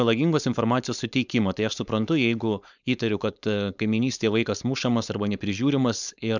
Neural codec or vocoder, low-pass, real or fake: codec, 16 kHz, 4.8 kbps, FACodec; 7.2 kHz; fake